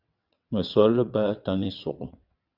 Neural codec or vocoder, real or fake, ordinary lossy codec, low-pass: vocoder, 22.05 kHz, 80 mel bands, WaveNeXt; fake; Opus, 64 kbps; 5.4 kHz